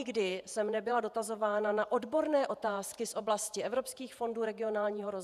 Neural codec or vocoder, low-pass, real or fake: vocoder, 48 kHz, 128 mel bands, Vocos; 14.4 kHz; fake